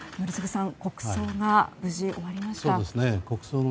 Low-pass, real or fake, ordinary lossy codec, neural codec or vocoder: none; real; none; none